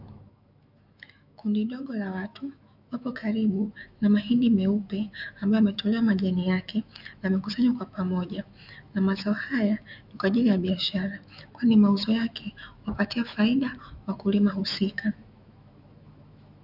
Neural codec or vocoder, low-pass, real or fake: autoencoder, 48 kHz, 128 numbers a frame, DAC-VAE, trained on Japanese speech; 5.4 kHz; fake